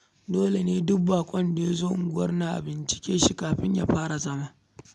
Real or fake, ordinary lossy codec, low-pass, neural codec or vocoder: real; none; none; none